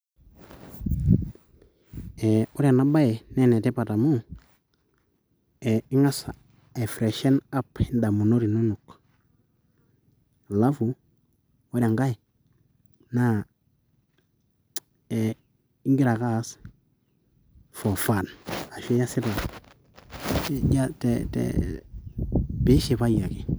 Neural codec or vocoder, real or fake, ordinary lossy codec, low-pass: none; real; none; none